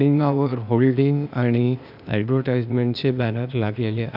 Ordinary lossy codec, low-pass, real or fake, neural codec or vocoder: none; 5.4 kHz; fake; codec, 16 kHz, 0.8 kbps, ZipCodec